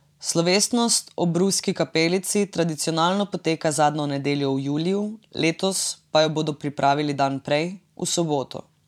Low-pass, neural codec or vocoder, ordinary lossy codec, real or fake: 19.8 kHz; vocoder, 44.1 kHz, 128 mel bands every 512 samples, BigVGAN v2; none; fake